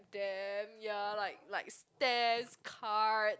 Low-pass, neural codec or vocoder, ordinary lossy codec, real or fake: none; none; none; real